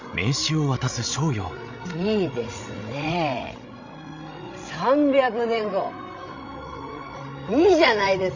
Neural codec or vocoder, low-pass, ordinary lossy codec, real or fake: codec, 16 kHz, 16 kbps, FreqCodec, larger model; 7.2 kHz; Opus, 64 kbps; fake